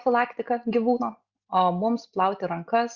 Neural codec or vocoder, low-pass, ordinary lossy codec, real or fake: none; 7.2 kHz; Opus, 64 kbps; real